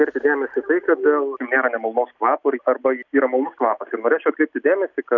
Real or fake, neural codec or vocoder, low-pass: real; none; 7.2 kHz